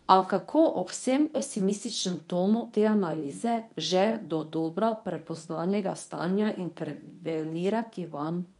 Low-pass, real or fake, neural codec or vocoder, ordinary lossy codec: 10.8 kHz; fake; codec, 24 kHz, 0.9 kbps, WavTokenizer, medium speech release version 1; none